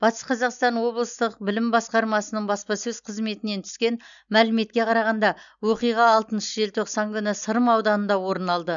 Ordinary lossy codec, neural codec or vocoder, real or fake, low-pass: none; none; real; 7.2 kHz